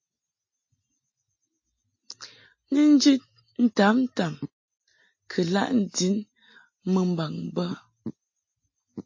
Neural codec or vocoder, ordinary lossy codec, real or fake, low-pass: none; MP3, 32 kbps; real; 7.2 kHz